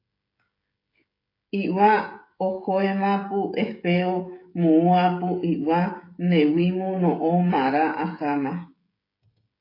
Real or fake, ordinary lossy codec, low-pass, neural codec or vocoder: fake; AAC, 32 kbps; 5.4 kHz; codec, 16 kHz, 16 kbps, FreqCodec, smaller model